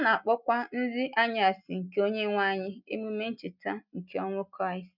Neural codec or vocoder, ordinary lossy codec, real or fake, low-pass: none; none; real; 5.4 kHz